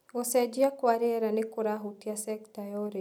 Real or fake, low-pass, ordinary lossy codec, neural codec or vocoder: real; none; none; none